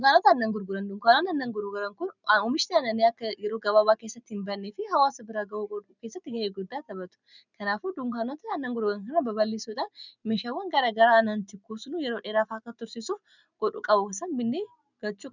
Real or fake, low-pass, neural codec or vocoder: real; 7.2 kHz; none